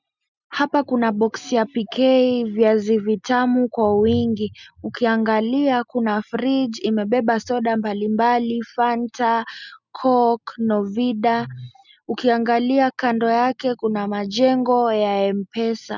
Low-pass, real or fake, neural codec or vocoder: 7.2 kHz; real; none